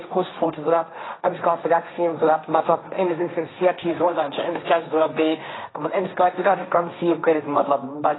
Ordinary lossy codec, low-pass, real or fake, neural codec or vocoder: AAC, 16 kbps; 7.2 kHz; fake; codec, 16 kHz, 1.1 kbps, Voila-Tokenizer